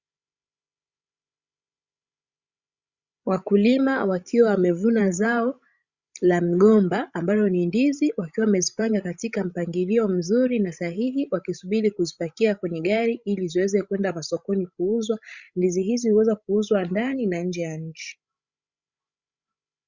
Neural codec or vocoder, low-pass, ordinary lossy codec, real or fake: codec, 16 kHz, 16 kbps, FreqCodec, larger model; 7.2 kHz; Opus, 64 kbps; fake